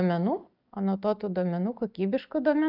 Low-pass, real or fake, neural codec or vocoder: 5.4 kHz; real; none